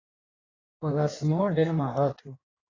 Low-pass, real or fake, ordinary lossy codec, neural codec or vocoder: 7.2 kHz; fake; AAC, 32 kbps; codec, 16 kHz in and 24 kHz out, 1.1 kbps, FireRedTTS-2 codec